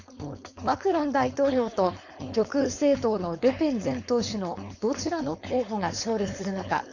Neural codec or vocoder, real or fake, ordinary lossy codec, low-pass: codec, 16 kHz, 4.8 kbps, FACodec; fake; none; 7.2 kHz